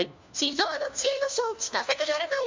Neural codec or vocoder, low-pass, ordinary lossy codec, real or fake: codec, 16 kHz, 1 kbps, FunCodec, trained on LibriTTS, 50 frames a second; 7.2 kHz; MP3, 64 kbps; fake